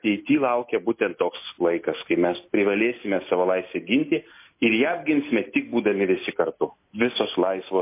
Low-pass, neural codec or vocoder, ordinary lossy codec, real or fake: 3.6 kHz; none; MP3, 24 kbps; real